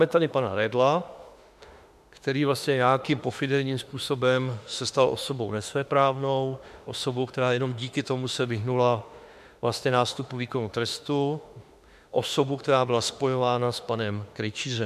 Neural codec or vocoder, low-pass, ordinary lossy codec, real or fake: autoencoder, 48 kHz, 32 numbers a frame, DAC-VAE, trained on Japanese speech; 14.4 kHz; MP3, 96 kbps; fake